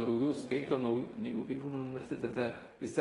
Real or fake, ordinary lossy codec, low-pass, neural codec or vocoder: fake; AAC, 32 kbps; 10.8 kHz; codec, 16 kHz in and 24 kHz out, 0.9 kbps, LongCat-Audio-Codec, fine tuned four codebook decoder